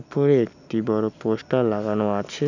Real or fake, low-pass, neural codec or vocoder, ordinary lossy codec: real; 7.2 kHz; none; none